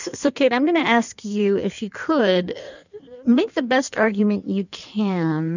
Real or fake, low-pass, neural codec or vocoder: fake; 7.2 kHz; codec, 16 kHz in and 24 kHz out, 1.1 kbps, FireRedTTS-2 codec